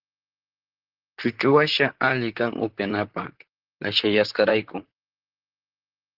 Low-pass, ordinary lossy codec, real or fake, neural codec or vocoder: 5.4 kHz; Opus, 16 kbps; fake; vocoder, 44.1 kHz, 128 mel bands, Pupu-Vocoder